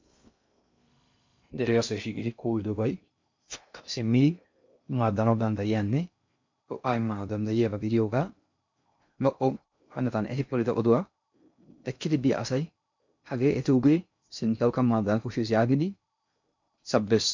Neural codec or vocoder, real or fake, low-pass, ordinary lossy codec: codec, 16 kHz in and 24 kHz out, 0.6 kbps, FocalCodec, streaming, 2048 codes; fake; 7.2 kHz; MP3, 64 kbps